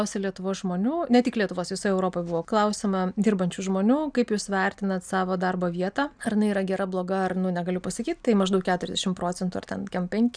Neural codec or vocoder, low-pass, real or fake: none; 9.9 kHz; real